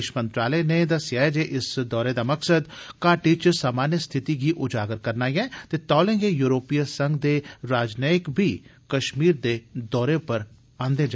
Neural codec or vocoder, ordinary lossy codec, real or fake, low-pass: none; none; real; none